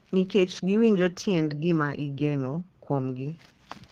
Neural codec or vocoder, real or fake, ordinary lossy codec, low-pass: codec, 32 kHz, 1.9 kbps, SNAC; fake; Opus, 16 kbps; 14.4 kHz